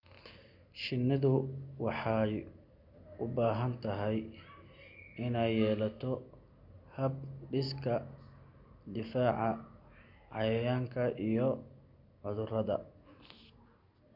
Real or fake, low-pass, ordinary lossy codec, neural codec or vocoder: real; 5.4 kHz; none; none